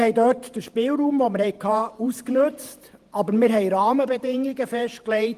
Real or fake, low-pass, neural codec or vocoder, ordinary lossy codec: fake; 14.4 kHz; vocoder, 48 kHz, 128 mel bands, Vocos; Opus, 24 kbps